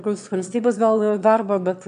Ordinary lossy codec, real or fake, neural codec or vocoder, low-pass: MP3, 96 kbps; fake; autoencoder, 22.05 kHz, a latent of 192 numbers a frame, VITS, trained on one speaker; 9.9 kHz